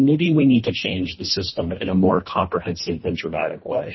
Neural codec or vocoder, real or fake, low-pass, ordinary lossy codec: codec, 24 kHz, 1.5 kbps, HILCodec; fake; 7.2 kHz; MP3, 24 kbps